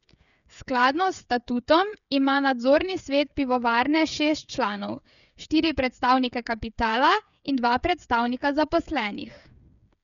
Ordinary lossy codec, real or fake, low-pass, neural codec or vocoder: none; fake; 7.2 kHz; codec, 16 kHz, 8 kbps, FreqCodec, smaller model